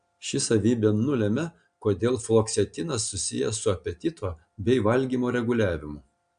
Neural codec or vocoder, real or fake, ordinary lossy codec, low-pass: none; real; AAC, 96 kbps; 9.9 kHz